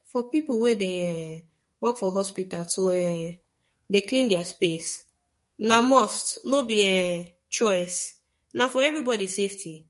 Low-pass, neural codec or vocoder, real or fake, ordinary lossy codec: 14.4 kHz; codec, 44.1 kHz, 2.6 kbps, SNAC; fake; MP3, 48 kbps